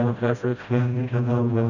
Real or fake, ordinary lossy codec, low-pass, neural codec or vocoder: fake; none; 7.2 kHz; codec, 16 kHz, 0.5 kbps, FreqCodec, smaller model